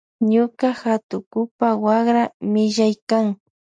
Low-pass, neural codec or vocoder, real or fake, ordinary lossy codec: 9.9 kHz; none; real; MP3, 64 kbps